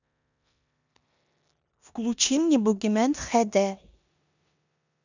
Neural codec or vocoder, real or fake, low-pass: codec, 16 kHz in and 24 kHz out, 0.9 kbps, LongCat-Audio-Codec, four codebook decoder; fake; 7.2 kHz